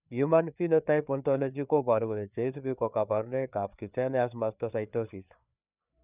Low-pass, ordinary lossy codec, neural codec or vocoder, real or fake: 3.6 kHz; none; codec, 16 kHz, 4 kbps, FreqCodec, larger model; fake